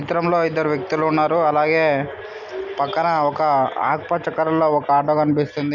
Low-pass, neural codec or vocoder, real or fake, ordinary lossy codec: 7.2 kHz; none; real; none